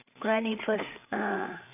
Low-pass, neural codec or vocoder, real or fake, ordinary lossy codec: 3.6 kHz; codec, 16 kHz, 8 kbps, FreqCodec, larger model; fake; none